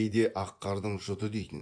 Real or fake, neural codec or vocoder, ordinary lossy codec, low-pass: fake; vocoder, 24 kHz, 100 mel bands, Vocos; AAC, 64 kbps; 9.9 kHz